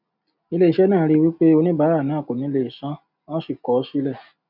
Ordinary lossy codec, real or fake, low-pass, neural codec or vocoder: none; real; 5.4 kHz; none